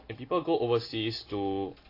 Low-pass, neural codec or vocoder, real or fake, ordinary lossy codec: 5.4 kHz; none; real; AAC, 32 kbps